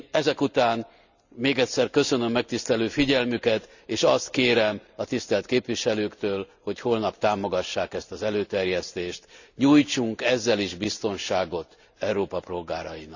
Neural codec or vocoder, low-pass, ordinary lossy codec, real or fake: none; 7.2 kHz; none; real